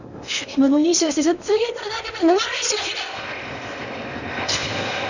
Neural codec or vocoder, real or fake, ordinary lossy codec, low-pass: codec, 16 kHz in and 24 kHz out, 0.8 kbps, FocalCodec, streaming, 65536 codes; fake; none; 7.2 kHz